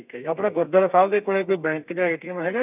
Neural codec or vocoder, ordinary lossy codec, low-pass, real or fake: codec, 32 kHz, 1.9 kbps, SNAC; none; 3.6 kHz; fake